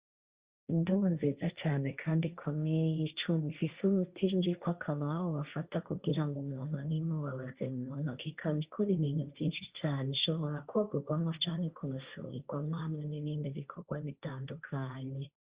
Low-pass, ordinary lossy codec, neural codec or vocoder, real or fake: 3.6 kHz; Opus, 64 kbps; codec, 16 kHz, 1.1 kbps, Voila-Tokenizer; fake